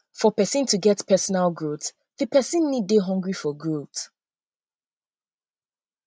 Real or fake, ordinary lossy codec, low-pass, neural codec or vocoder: real; none; none; none